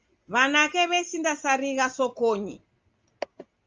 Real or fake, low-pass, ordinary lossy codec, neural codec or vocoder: real; 7.2 kHz; Opus, 24 kbps; none